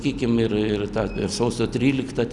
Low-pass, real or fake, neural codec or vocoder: 10.8 kHz; real; none